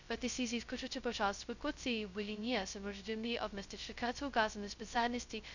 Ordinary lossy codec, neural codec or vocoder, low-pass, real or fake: none; codec, 16 kHz, 0.2 kbps, FocalCodec; 7.2 kHz; fake